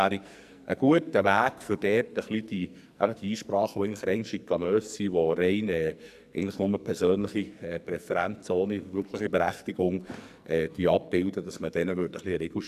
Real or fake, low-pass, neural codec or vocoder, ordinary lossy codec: fake; 14.4 kHz; codec, 44.1 kHz, 2.6 kbps, SNAC; none